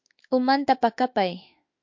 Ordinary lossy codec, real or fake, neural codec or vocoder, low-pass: MP3, 48 kbps; fake; autoencoder, 48 kHz, 32 numbers a frame, DAC-VAE, trained on Japanese speech; 7.2 kHz